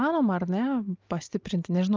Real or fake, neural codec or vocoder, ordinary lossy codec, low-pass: real; none; Opus, 32 kbps; 7.2 kHz